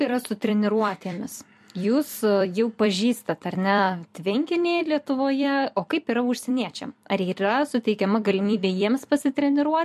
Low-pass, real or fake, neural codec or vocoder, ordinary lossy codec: 14.4 kHz; fake; vocoder, 44.1 kHz, 128 mel bands every 256 samples, BigVGAN v2; MP3, 64 kbps